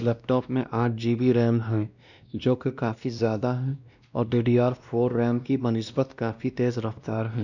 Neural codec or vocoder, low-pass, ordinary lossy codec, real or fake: codec, 16 kHz, 1 kbps, X-Codec, WavLM features, trained on Multilingual LibriSpeech; 7.2 kHz; none; fake